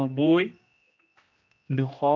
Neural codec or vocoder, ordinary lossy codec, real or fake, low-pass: codec, 16 kHz, 1 kbps, X-Codec, HuBERT features, trained on general audio; MP3, 64 kbps; fake; 7.2 kHz